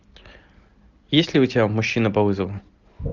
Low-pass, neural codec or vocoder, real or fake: 7.2 kHz; none; real